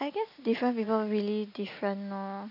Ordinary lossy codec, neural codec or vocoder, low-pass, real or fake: none; none; 5.4 kHz; real